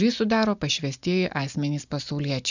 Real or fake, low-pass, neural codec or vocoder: real; 7.2 kHz; none